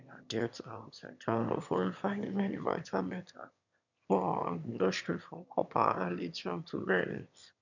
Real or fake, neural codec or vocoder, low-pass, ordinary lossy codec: fake; autoencoder, 22.05 kHz, a latent of 192 numbers a frame, VITS, trained on one speaker; 7.2 kHz; none